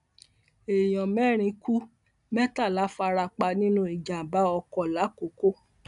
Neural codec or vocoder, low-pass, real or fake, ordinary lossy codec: none; 10.8 kHz; real; none